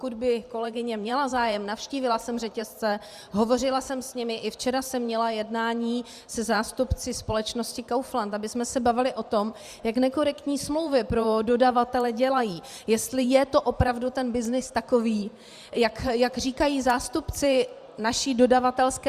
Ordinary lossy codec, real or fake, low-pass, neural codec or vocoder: Opus, 64 kbps; fake; 14.4 kHz; vocoder, 44.1 kHz, 128 mel bands every 512 samples, BigVGAN v2